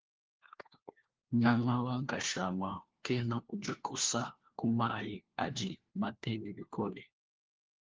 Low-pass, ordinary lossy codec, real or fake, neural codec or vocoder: 7.2 kHz; Opus, 16 kbps; fake; codec, 16 kHz, 1 kbps, FunCodec, trained on LibriTTS, 50 frames a second